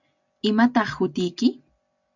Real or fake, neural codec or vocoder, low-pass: real; none; 7.2 kHz